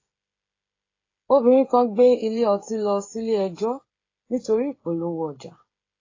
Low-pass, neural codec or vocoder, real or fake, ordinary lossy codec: 7.2 kHz; codec, 16 kHz, 16 kbps, FreqCodec, smaller model; fake; AAC, 32 kbps